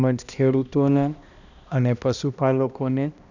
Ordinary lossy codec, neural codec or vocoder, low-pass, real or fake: none; codec, 16 kHz, 1 kbps, X-Codec, HuBERT features, trained on balanced general audio; 7.2 kHz; fake